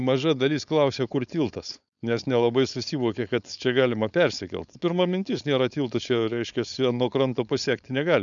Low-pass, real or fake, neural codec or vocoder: 7.2 kHz; fake; codec, 16 kHz, 4.8 kbps, FACodec